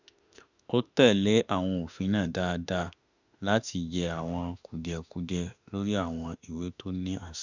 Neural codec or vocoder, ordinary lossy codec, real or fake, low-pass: autoencoder, 48 kHz, 32 numbers a frame, DAC-VAE, trained on Japanese speech; none; fake; 7.2 kHz